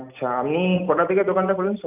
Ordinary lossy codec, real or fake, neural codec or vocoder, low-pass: none; real; none; 3.6 kHz